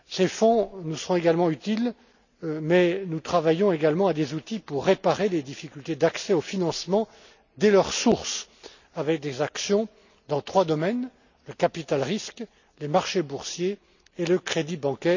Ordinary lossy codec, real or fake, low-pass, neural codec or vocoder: none; real; 7.2 kHz; none